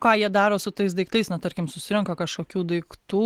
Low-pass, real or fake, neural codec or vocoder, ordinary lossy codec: 19.8 kHz; real; none; Opus, 16 kbps